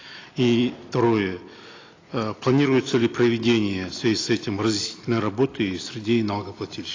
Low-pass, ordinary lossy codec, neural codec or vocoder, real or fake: 7.2 kHz; AAC, 32 kbps; none; real